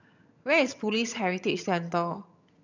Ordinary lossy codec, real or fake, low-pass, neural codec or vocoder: none; fake; 7.2 kHz; vocoder, 22.05 kHz, 80 mel bands, HiFi-GAN